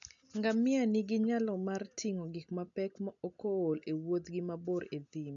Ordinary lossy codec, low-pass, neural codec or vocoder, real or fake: none; 7.2 kHz; none; real